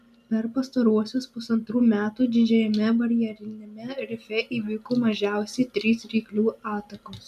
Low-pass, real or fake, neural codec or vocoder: 14.4 kHz; real; none